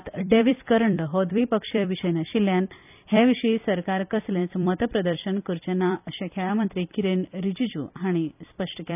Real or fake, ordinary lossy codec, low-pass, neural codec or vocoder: fake; none; 3.6 kHz; vocoder, 44.1 kHz, 128 mel bands every 256 samples, BigVGAN v2